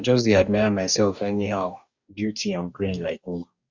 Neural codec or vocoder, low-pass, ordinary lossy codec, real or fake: codec, 44.1 kHz, 2.6 kbps, DAC; 7.2 kHz; Opus, 64 kbps; fake